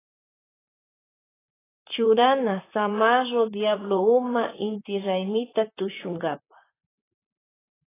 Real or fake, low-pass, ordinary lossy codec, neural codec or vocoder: fake; 3.6 kHz; AAC, 16 kbps; vocoder, 44.1 kHz, 128 mel bands, Pupu-Vocoder